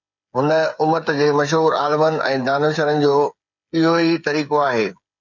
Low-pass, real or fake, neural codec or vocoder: 7.2 kHz; fake; codec, 16 kHz, 8 kbps, FreqCodec, smaller model